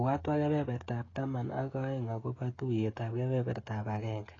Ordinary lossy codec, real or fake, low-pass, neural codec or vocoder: AAC, 32 kbps; fake; 7.2 kHz; codec, 16 kHz, 16 kbps, FreqCodec, smaller model